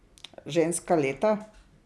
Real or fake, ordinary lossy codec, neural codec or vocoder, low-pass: real; none; none; none